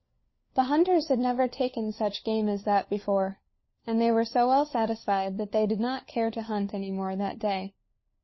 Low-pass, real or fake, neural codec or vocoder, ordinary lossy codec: 7.2 kHz; fake; codec, 16 kHz, 4 kbps, FunCodec, trained on LibriTTS, 50 frames a second; MP3, 24 kbps